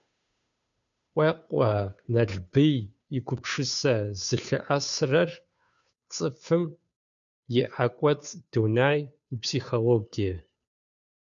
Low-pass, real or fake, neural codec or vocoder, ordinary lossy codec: 7.2 kHz; fake; codec, 16 kHz, 2 kbps, FunCodec, trained on Chinese and English, 25 frames a second; MP3, 96 kbps